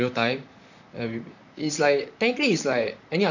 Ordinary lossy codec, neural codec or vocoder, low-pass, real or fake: none; vocoder, 44.1 kHz, 128 mel bands, Pupu-Vocoder; 7.2 kHz; fake